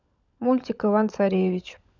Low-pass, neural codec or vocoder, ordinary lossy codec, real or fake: 7.2 kHz; codec, 16 kHz, 16 kbps, FunCodec, trained on LibriTTS, 50 frames a second; none; fake